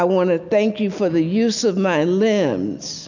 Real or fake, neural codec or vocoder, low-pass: real; none; 7.2 kHz